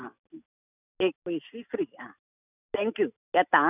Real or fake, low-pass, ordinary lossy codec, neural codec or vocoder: real; 3.6 kHz; none; none